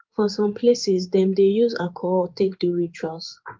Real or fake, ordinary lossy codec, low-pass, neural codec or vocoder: fake; Opus, 32 kbps; 7.2 kHz; codec, 16 kHz in and 24 kHz out, 1 kbps, XY-Tokenizer